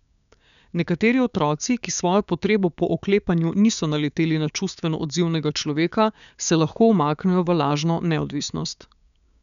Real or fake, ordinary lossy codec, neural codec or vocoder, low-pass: fake; none; codec, 16 kHz, 6 kbps, DAC; 7.2 kHz